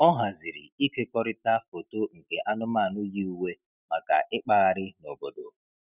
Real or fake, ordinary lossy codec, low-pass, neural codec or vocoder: real; none; 3.6 kHz; none